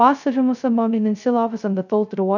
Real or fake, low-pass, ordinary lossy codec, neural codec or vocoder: fake; 7.2 kHz; none; codec, 16 kHz, 0.2 kbps, FocalCodec